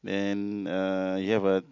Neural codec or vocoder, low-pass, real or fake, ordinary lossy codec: none; 7.2 kHz; real; none